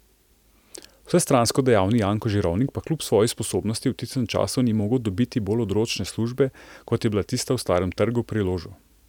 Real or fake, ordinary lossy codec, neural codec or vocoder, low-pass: real; none; none; 19.8 kHz